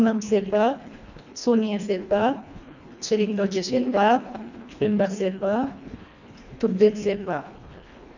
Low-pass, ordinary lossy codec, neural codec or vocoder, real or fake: 7.2 kHz; none; codec, 24 kHz, 1.5 kbps, HILCodec; fake